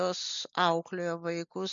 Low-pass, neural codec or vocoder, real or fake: 7.2 kHz; none; real